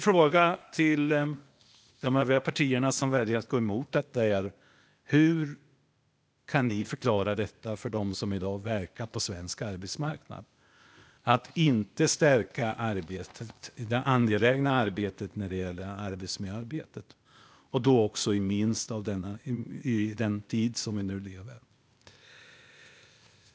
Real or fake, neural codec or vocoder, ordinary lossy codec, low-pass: fake; codec, 16 kHz, 0.8 kbps, ZipCodec; none; none